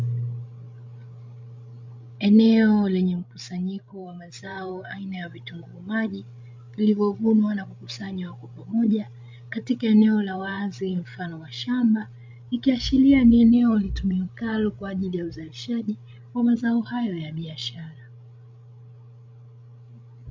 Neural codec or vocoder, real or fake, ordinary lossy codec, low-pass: codec, 16 kHz, 16 kbps, FreqCodec, larger model; fake; MP3, 64 kbps; 7.2 kHz